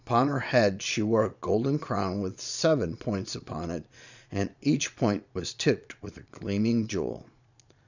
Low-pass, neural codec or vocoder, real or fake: 7.2 kHz; vocoder, 22.05 kHz, 80 mel bands, Vocos; fake